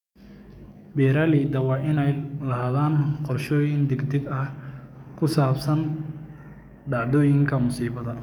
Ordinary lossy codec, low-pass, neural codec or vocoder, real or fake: none; 19.8 kHz; codec, 44.1 kHz, 7.8 kbps, DAC; fake